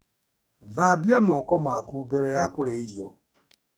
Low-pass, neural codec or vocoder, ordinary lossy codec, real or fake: none; codec, 44.1 kHz, 2.6 kbps, DAC; none; fake